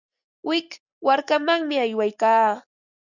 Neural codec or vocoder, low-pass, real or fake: none; 7.2 kHz; real